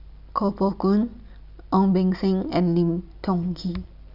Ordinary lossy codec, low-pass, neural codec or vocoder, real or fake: none; 5.4 kHz; none; real